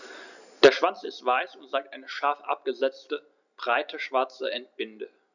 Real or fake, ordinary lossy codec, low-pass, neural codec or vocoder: real; none; 7.2 kHz; none